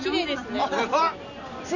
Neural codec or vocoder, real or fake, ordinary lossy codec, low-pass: none; real; none; 7.2 kHz